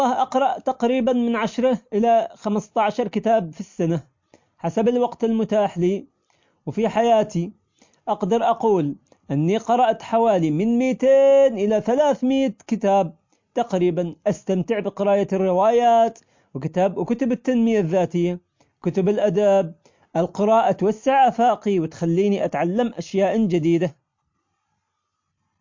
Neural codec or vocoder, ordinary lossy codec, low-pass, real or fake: none; MP3, 48 kbps; 7.2 kHz; real